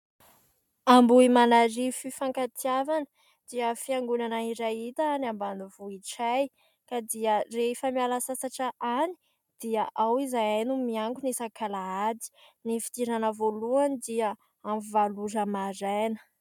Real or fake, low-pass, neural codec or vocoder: real; 19.8 kHz; none